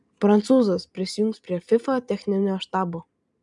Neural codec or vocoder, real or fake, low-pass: none; real; 10.8 kHz